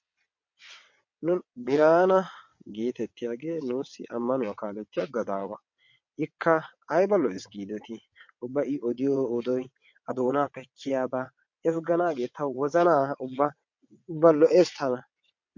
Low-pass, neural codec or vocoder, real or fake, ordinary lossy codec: 7.2 kHz; vocoder, 22.05 kHz, 80 mel bands, WaveNeXt; fake; MP3, 48 kbps